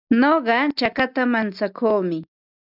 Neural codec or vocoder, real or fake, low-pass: none; real; 5.4 kHz